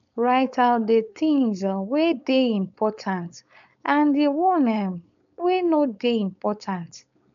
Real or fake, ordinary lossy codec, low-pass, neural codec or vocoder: fake; none; 7.2 kHz; codec, 16 kHz, 4.8 kbps, FACodec